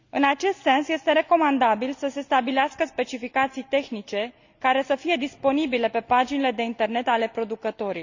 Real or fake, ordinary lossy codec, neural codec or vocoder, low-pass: real; Opus, 64 kbps; none; 7.2 kHz